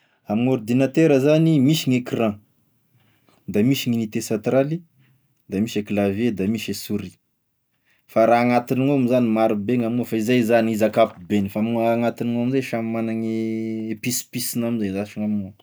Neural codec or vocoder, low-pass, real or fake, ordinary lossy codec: none; none; real; none